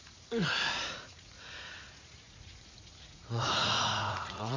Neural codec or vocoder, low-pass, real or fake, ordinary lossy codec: vocoder, 44.1 kHz, 80 mel bands, Vocos; 7.2 kHz; fake; MP3, 48 kbps